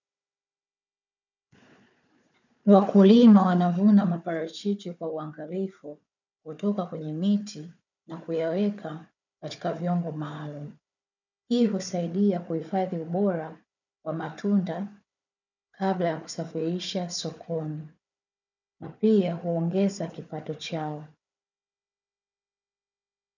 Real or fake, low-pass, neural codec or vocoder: fake; 7.2 kHz; codec, 16 kHz, 4 kbps, FunCodec, trained on Chinese and English, 50 frames a second